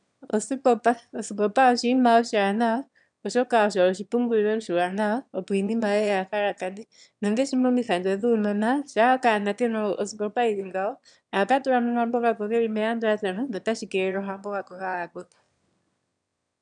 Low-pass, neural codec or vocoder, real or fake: 9.9 kHz; autoencoder, 22.05 kHz, a latent of 192 numbers a frame, VITS, trained on one speaker; fake